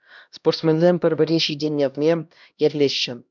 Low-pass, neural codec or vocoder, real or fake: 7.2 kHz; codec, 16 kHz, 1 kbps, X-Codec, HuBERT features, trained on LibriSpeech; fake